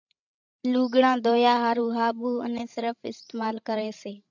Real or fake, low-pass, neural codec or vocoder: fake; 7.2 kHz; codec, 16 kHz, 16 kbps, FreqCodec, larger model